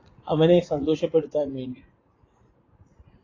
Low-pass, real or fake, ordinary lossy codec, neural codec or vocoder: 7.2 kHz; fake; AAC, 32 kbps; vocoder, 44.1 kHz, 80 mel bands, Vocos